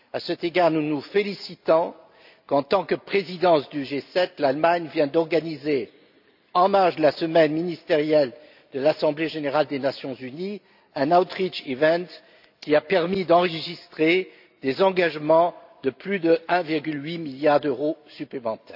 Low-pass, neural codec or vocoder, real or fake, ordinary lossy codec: 5.4 kHz; none; real; AAC, 48 kbps